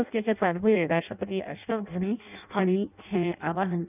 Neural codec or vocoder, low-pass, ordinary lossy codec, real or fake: codec, 16 kHz in and 24 kHz out, 0.6 kbps, FireRedTTS-2 codec; 3.6 kHz; none; fake